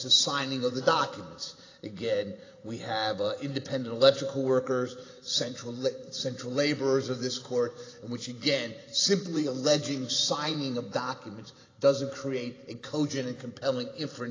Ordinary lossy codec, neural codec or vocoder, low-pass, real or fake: AAC, 32 kbps; vocoder, 44.1 kHz, 128 mel bands every 512 samples, BigVGAN v2; 7.2 kHz; fake